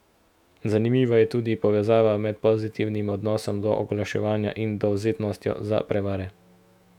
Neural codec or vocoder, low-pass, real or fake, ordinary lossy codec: autoencoder, 48 kHz, 128 numbers a frame, DAC-VAE, trained on Japanese speech; 19.8 kHz; fake; none